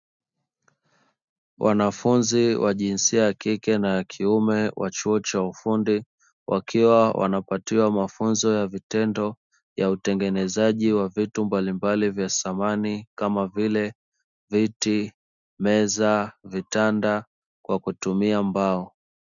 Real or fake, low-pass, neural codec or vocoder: real; 7.2 kHz; none